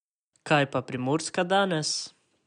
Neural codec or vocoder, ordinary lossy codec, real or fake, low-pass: none; none; real; 9.9 kHz